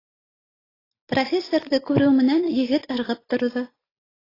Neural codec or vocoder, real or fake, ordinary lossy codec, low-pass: none; real; AAC, 24 kbps; 5.4 kHz